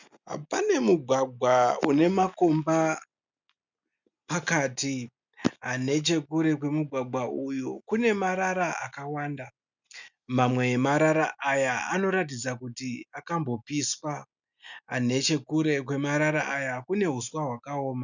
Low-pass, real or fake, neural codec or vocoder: 7.2 kHz; real; none